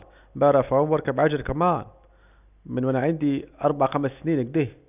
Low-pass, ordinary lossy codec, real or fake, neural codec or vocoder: 3.6 kHz; none; real; none